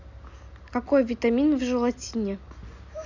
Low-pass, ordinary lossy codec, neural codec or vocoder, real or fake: 7.2 kHz; Opus, 64 kbps; none; real